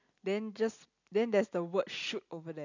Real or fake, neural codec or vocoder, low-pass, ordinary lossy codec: real; none; 7.2 kHz; none